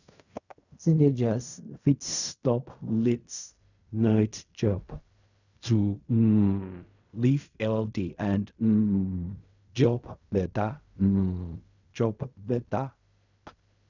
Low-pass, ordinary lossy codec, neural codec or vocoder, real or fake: 7.2 kHz; none; codec, 16 kHz in and 24 kHz out, 0.4 kbps, LongCat-Audio-Codec, fine tuned four codebook decoder; fake